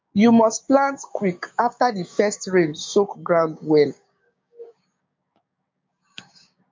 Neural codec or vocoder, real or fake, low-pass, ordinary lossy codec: codec, 16 kHz, 6 kbps, DAC; fake; 7.2 kHz; MP3, 48 kbps